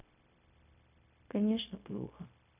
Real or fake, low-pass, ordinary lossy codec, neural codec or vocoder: fake; 3.6 kHz; none; codec, 16 kHz, 0.4 kbps, LongCat-Audio-Codec